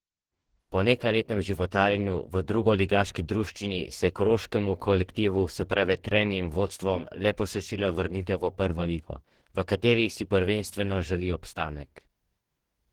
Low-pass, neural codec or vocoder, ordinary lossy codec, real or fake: 19.8 kHz; codec, 44.1 kHz, 2.6 kbps, DAC; Opus, 16 kbps; fake